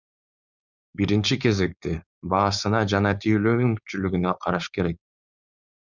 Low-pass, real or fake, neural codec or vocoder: 7.2 kHz; fake; codec, 16 kHz, 4.8 kbps, FACodec